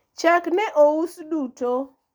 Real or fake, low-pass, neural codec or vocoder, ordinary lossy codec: real; none; none; none